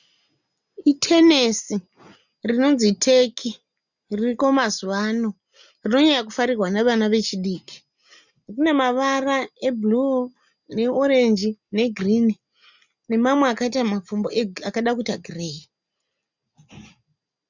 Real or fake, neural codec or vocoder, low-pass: real; none; 7.2 kHz